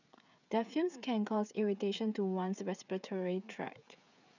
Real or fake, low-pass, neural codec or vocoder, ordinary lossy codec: fake; 7.2 kHz; codec, 16 kHz, 16 kbps, FreqCodec, smaller model; none